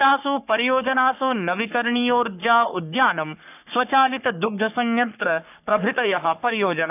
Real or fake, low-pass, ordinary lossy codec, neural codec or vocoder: fake; 3.6 kHz; none; codec, 44.1 kHz, 3.4 kbps, Pupu-Codec